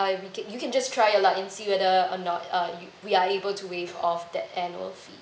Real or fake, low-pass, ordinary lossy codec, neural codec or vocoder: real; none; none; none